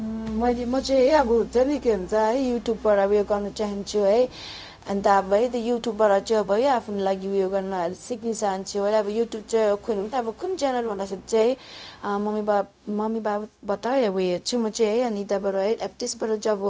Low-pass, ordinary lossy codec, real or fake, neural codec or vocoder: none; none; fake; codec, 16 kHz, 0.4 kbps, LongCat-Audio-Codec